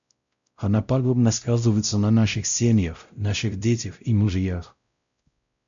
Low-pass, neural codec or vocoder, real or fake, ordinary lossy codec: 7.2 kHz; codec, 16 kHz, 0.5 kbps, X-Codec, WavLM features, trained on Multilingual LibriSpeech; fake; MP3, 64 kbps